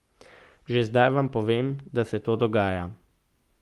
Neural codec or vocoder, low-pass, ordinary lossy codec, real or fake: codec, 44.1 kHz, 7.8 kbps, Pupu-Codec; 14.4 kHz; Opus, 32 kbps; fake